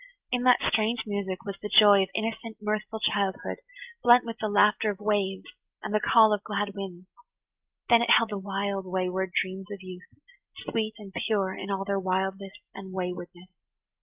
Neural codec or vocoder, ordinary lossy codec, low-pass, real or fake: none; Opus, 24 kbps; 3.6 kHz; real